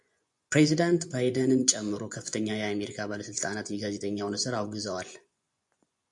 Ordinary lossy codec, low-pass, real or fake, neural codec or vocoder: MP3, 64 kbps; 10.8 kHz; fake; vocoder, 24 kHz, 100 mel bands, Vocos